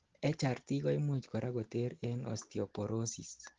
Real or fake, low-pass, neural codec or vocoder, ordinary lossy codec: real; 7.2 kHz; none; Opus, 16 kbps